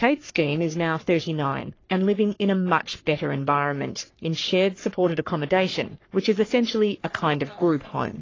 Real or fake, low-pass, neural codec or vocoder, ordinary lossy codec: fake; 7.2 kHz; codec, 44.1 kHz, 3.4 kbps, Pupu-Codec; AAC, 32 kbps